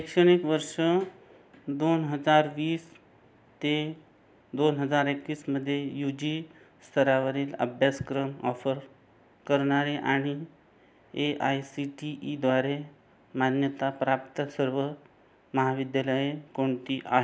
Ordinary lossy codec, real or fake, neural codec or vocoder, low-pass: none; real; none; none